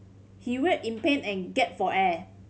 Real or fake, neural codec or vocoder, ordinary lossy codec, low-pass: real; none; none; none